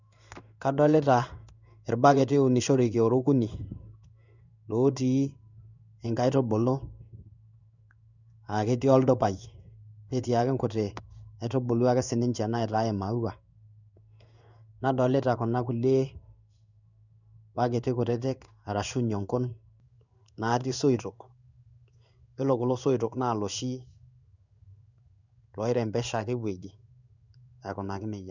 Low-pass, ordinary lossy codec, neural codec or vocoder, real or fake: 7.2 kHz; none; codec, 16 kHz in and 24 kHz out, 1 kbps, XY-Tokenizer; fake